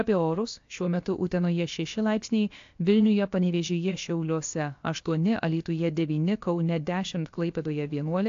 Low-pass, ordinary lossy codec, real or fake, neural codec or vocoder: 7.2 kHz; AAC, 48 kbps; fake; codec, 16 kHz, about 1 kbps, DyCAST, with the encoder's durations